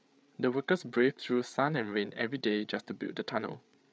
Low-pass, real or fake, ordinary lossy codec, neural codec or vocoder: none; fake; none; codec, 16 kHz, 8 kbps, FreqCodec, larger model